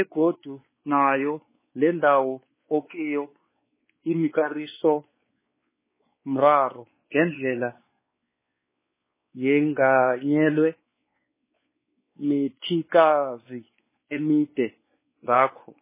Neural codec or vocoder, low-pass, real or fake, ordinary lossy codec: codec, 16 kHz, 4 kbps, X-Codec, WavLM features, trained on Multilingual LibriSpeech; 3.6 kHz; fake; MP3, 16 kbps